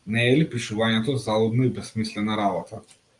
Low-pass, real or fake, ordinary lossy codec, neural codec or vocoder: 10.8 kHz; real; Opus, 32 kbps; none